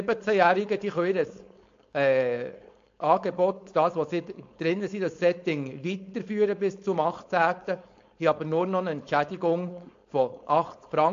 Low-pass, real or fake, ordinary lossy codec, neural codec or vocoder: 7.2 kHz; fake; AAC, 48 kbps; codec, 16 kHz, 4.8 kbps, FACodec